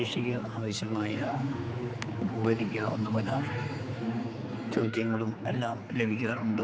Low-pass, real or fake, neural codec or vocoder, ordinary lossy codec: none; fake; codec, 16 kHz, 4 kbps, X-Codec, HuBERT features, trained on general audio; none